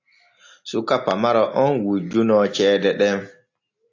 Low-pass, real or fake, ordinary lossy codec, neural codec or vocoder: 7.2 kHz; real; AAC, 48 kbps; none